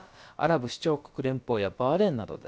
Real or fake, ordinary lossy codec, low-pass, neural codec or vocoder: fake; none; none; codec, 16 kHz, about 1 kbps, DyCAST, with the encoder's durations